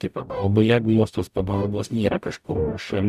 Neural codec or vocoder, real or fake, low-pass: codec, 44.1 kHz, 0.9 kbps, DAC; fake; 14.4 kHz